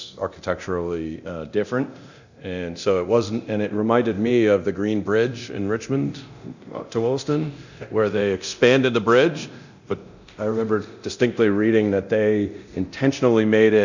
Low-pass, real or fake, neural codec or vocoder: 7.2 kHz; fake; codec, 24 kHz, 0.9 kbps, DualCodec